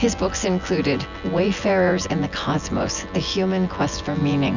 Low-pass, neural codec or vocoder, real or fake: 7.2 kHz; vocoder, 24 kHz, 100 mel bands, Vocos; fake